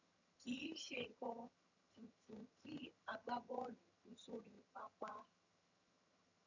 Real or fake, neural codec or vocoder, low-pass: fake; vocoder, 22.05 kHz, 80 mel bands, HiFi-GAN; 7.2 kHz